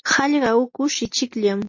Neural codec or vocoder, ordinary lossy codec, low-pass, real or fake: none; MP3, 32 kbps; 7.2 kHz; real